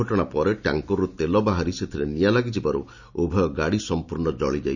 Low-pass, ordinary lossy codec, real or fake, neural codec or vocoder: 7.2 kHz; none; real; none